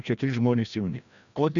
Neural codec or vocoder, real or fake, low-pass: codec, 16 kHz, 1 kbps, FreqCodec, larger model; fake; 7.2 kHz